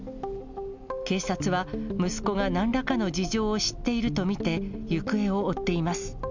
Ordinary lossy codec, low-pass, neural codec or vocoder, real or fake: none; 7.2 kHz; none; real